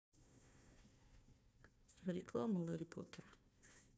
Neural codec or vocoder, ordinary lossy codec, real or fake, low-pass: codec, 16 kHz, 1 kbps, FunCodec, trained on Chinese and English, 50 frames a second; none; fake; none